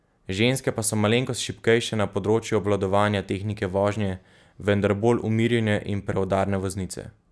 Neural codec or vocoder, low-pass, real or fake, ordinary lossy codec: none; none; real; none